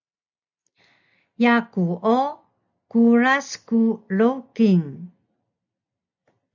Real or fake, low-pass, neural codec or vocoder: real; 7.2 kHz; none